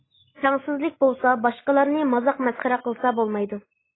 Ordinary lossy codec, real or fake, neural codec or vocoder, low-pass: AAC, 16 kbps; real; none; 7.2 kHz